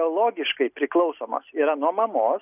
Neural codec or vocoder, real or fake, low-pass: none; real; 3.6 kHz